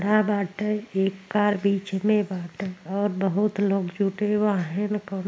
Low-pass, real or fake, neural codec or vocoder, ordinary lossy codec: none; real; none; none